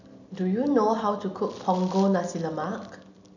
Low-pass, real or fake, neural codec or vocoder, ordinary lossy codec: 7.2 kHz; real; none; none